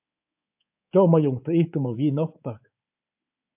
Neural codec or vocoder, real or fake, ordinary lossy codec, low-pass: codec, 24 kHz, 3.1 kbps, DualCodec; fake; AAC, 32 kbps; 3.6 kHz